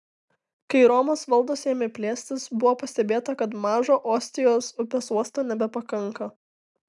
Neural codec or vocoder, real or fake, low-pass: none; real; 10.8 kHz